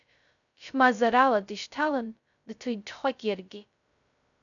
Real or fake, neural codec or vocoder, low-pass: fake; codec, 16 kHz, 0.2 kbps, FocalCodec; 7.2 kHz